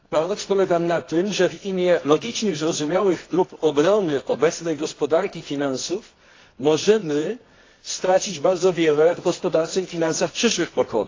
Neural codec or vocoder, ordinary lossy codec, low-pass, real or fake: codec, 24 kHz, 0.9 kbps, WavTokenizer, medium music audio release; AAC, 32 kbps; 7.2 kHz; fake